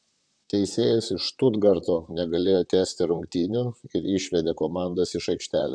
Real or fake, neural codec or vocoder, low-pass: fake; vocoder, 44.1 kHz, 128 mel bands, Pupu-Vocoder; 9.9 kHz